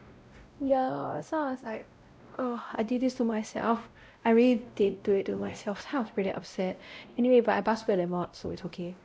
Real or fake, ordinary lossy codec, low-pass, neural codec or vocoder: fake; none; none; codec, 16 kHz, 0.5 kbps, X-Codec, WavLM features, trained on Multilingual LibriSpeech